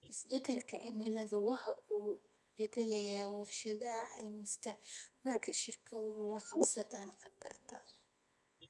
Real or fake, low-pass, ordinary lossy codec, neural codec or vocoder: fake; none; none; codec, 24 kHz, 0.9 kbps, WavTokenizer, medium music audio release